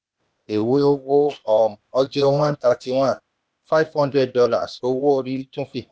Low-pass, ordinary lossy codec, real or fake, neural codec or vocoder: none; none; fake; codec, 16 kHz, 0.8 kbps, ZipCodec